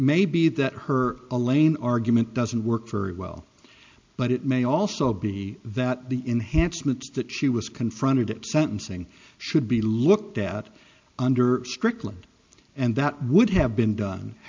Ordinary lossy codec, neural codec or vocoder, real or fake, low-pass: MP3, 64 kbps; none; real; 7.2 kHz